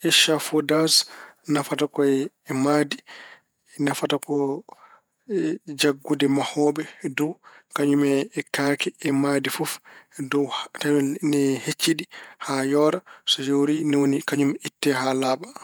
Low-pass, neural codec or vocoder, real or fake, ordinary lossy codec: none; vocoder, 48 kHz, 128 mel bands, Vocos; fake; none